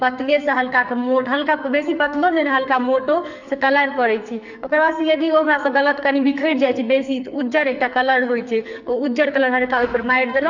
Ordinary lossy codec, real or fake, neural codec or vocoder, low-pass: none; fake; codec, 44.1 kHz, 2.6 kbps, SNAC; 7.2 kHz